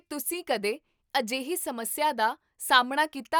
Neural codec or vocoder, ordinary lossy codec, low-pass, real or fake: none; none; none; real